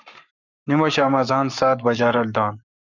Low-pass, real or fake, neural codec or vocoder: 7.2 kHz; fake; codec, 44.1 kHz, 7.8 kbps, Pupu-Codec